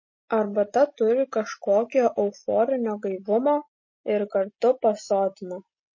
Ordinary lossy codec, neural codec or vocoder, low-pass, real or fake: MP3, 32 kbps; none; 7.2 kHz; real